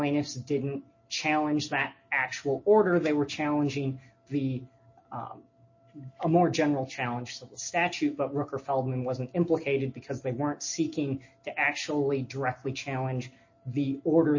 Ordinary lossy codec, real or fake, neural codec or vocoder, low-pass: MP3, 32 kbps; real; none; 7.2 kHz